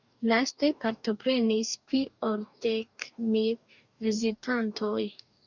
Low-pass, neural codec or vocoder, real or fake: 7.2 kHz; codec, 44.1 kHz, 2.6 kbps, DAC; fake